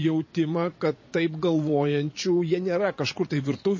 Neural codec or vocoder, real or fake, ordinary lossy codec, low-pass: vocoder, 22.05 kHz, 80 mel bands, Vocos; fake; MP3, 32 kbps; 7.2 kHz